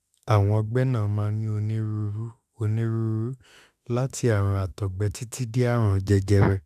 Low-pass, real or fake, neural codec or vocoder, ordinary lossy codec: 14.4 kHz; fake; autoencoder, 48 kHz, 32 numbers a frame, DAC-VAE, trained on Japanese speech; none